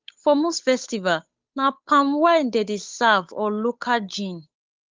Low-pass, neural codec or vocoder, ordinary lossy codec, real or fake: 7.2 kHz; codec, 16 kHz, 8 kbps, FunCodec, trained on Chinese and English, 25 frames a second; Opus, 32 kbps; fake